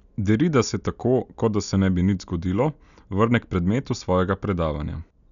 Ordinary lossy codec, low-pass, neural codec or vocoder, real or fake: none; 7.2 kHz; none; real